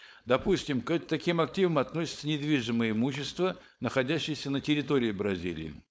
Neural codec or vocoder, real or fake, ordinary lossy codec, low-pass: codec, 16 kHz, 4.8 kbps, FACodec; fake; none; none